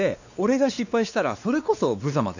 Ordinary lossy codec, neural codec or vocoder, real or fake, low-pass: none; codec, 16 kHz, 2 kbps, X-Codec, WavLM features, trained on Multilingual LibriSpeech; fake; 7.2 kHz